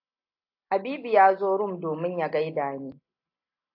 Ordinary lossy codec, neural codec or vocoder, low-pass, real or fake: AAC, 48 kbps; none; 5.4 kHz; real